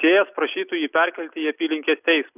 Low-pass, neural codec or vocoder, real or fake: 3.6 kHz; none; real